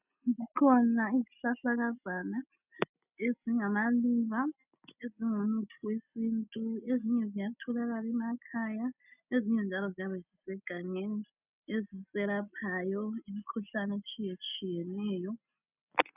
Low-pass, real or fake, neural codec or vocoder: 3.6 kHz; real; none